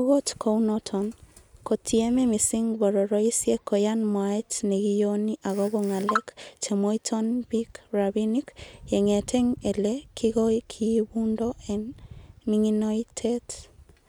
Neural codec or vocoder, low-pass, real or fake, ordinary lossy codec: none; none; real; none